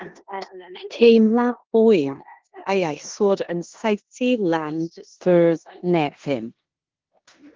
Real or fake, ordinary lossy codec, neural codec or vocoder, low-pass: fake; Opus, 16 kbps; codec, 16 kHz in and 24 kHz out, 0.9 kbps, LongCat-Audio-Codec, four codebook decoder; 7.2 kHz